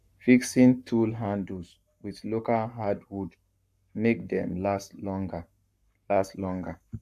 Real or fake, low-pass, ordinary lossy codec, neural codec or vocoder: fake; 14.4 kHz; AAC, 96 kbps; codec, 44.1 kHz, 7.8 kbps, Pupu-Codec